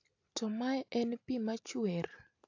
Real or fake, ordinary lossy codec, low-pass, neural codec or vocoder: real; AAC, 48 kbps; 7.2 kHz; none